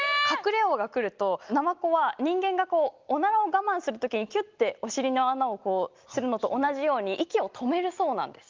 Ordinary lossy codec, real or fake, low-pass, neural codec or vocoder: Opus, 32 kbps; real; 7.2 kHz; none